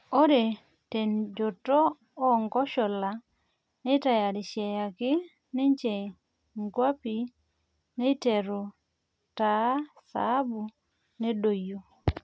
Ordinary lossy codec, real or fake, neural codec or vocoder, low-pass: none; real; none; none